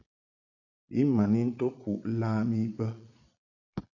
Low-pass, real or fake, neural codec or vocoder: 7.2 kHz; fake; vocoder, 22.05 kHz, 80 mel bands, Vocos